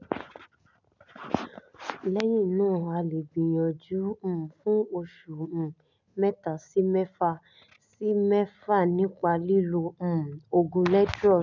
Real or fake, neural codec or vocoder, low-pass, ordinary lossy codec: real; none; 7.2 kHz; none